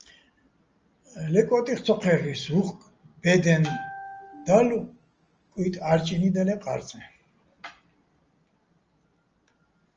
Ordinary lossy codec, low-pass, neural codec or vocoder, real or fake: Opus, 24 kbps; 7.2 kHz; none; real